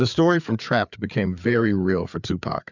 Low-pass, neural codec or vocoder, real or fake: 7.2 kHz; codec, 16 kHz, 4 kbps, FreqCodec, larger model; fake